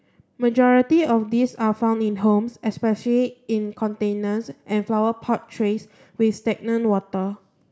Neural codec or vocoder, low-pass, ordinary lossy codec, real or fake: none; none; none; real